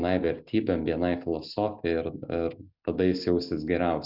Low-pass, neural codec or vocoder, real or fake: 5.4 kHz; none; real